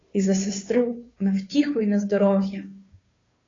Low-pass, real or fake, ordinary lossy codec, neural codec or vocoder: 7.2 kHz; fake; AAC, 32 kbps; codec, 16 kHz, 2 kbps, FunCodec, trained on Chinese and English, 25 frames a second